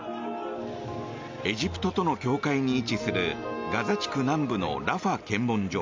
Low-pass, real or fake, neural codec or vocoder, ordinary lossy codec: 7.2 kHz; real; none; MP3, 64 kbps